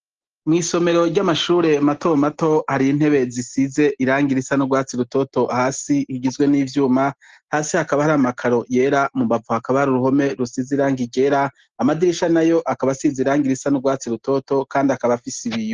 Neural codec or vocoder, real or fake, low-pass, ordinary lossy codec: none; real; 7.2 kHz; Opus, 16 kbps